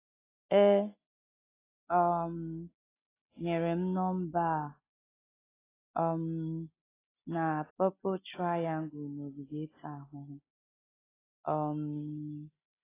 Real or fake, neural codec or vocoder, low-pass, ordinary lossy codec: real; none; 3.6 kHz; AAC, 16 kbps